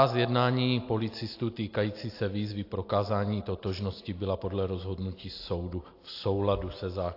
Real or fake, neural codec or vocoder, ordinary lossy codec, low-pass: real; none; AAC, 32 kbps; 5.4 kHz